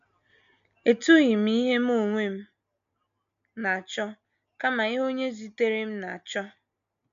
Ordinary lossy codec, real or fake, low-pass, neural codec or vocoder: MP3, 64 kbps; real; 7.2 kHz; none